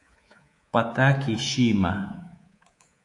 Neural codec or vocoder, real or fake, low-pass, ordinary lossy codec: codec, 24 kHz, 3.1 kbps, DualCodec; fake; 10.8 kHz; AAC, 48 kbps